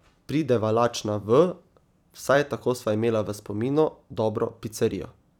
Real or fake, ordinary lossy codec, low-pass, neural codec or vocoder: fake; none; 19.8 kHz; vocoder, 44.1 kHz, 128 mel bands every 256 samples, BigVGAN v2